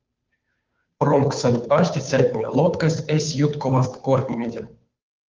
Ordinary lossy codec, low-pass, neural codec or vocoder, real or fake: Opus, 24 kbps; 7.2 kHz; codec, 16 kHz, 2 kbps, FunCodec, trained on Chinese and English, 25 frames a second; fake